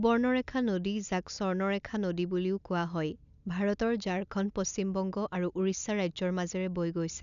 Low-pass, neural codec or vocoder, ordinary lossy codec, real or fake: 7.2 kHz; none; none; real